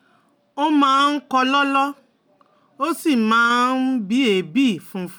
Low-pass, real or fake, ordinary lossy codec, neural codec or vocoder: none; real; none; none